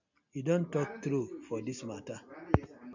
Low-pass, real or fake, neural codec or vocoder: 7.2 kHz; real; none